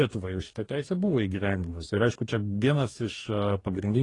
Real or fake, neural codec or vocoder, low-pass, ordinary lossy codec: fake; codec, 44.1 kHz, 2.6 kbps, DAC; 10.8 kHz; AAC, 32 kbps